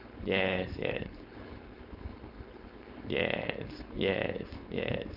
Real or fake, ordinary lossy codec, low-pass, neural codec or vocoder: fake; none; 5.4 kHz; codec, 16 kHz, 4.8 kbps, FACodec